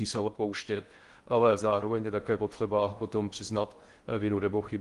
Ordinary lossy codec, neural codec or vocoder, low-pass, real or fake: Opus, 24 kbps; codec, 16 kHz in and 24 kHz out, 0.6 kbps, FocalCodec, streaming, 4096 codes; 10.8 kHz; fake